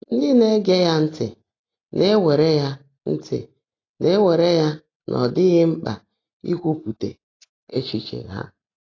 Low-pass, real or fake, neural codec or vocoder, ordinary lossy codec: 7.2 kHz; real; none; AAC, 32 kbps